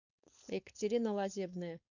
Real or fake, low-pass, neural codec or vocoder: fake; 7.2 kHz; codec, 16 kHz, 4.8 kbps, FACodec